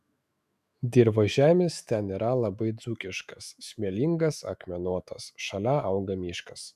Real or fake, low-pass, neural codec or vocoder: fake; 14.4 kHz; autoencoder, 48 kHz, 128 numbers a frame, DAC-VAE, trained on Japanese speech